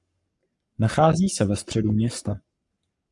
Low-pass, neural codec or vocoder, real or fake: 10.8 kHz; codec, 44.1 kHz, 7.8 kbps, Pupu-Codec; fake